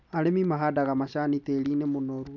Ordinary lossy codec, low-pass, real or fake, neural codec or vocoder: none; 7.2 kHz; real; none